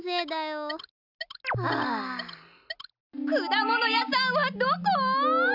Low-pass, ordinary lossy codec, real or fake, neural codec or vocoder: 5.4 kHz; none; real; none